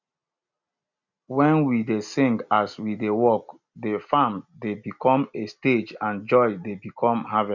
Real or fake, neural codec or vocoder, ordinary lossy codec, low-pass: real; none; none; 7.2 kHz